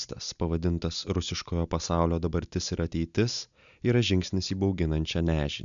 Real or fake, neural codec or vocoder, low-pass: real; none; 7.2 kHz